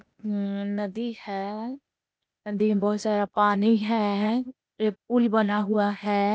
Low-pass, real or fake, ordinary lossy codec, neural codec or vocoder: none; fake; none; codec, 16 kHz, 0.8 kbps, ZipCodec